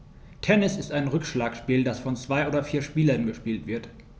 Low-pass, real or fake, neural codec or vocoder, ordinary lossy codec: none; real; none; none